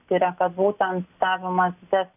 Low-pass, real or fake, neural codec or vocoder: 3.6 kHz; real; none